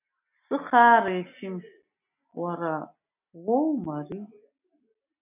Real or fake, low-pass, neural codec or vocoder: real; 3.6 kHz; none